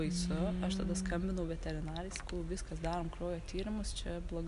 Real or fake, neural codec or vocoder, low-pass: real; none; 10.8 kHz